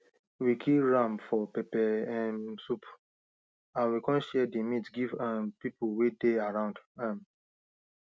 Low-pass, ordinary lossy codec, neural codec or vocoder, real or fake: none; none; none; real